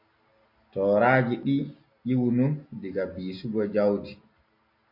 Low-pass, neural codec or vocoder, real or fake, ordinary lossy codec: 5.4 kHz; none; real; MP3, 32 kbps